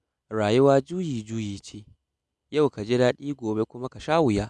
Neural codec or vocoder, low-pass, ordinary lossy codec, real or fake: none; none; none; real